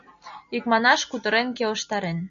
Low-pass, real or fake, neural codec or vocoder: 7.2 kHz; real; none